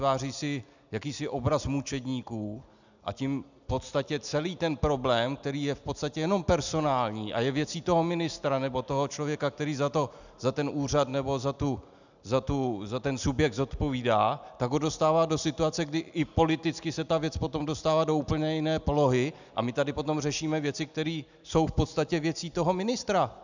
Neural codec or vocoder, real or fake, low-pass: none; real; 7.2 kHz